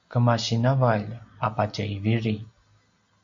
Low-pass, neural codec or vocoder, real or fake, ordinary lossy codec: 7.2 kHz; none; real; MP3, 48 kbps